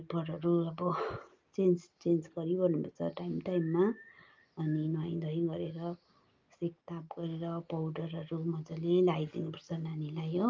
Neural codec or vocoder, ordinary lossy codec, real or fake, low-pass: none; Opus, 24 kbps; real; 7.2 kHz